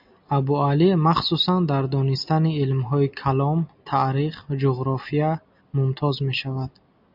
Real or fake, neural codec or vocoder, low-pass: real; none; 5.4 kHz